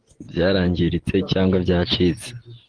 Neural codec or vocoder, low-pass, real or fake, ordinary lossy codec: none; 9.9 kHz; real; Opus, 16 kbps